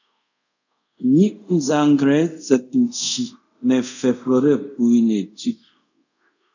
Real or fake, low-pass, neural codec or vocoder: fake; 7.2 kHz; codec, 24 kHz, 0.5 kbps, DualCodec